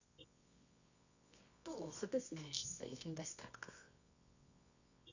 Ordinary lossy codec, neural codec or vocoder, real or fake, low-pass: none; codec, 24 kHz, 0.9 kbps, WavTokenizer, medium music audio release; fake; 7.2 kHz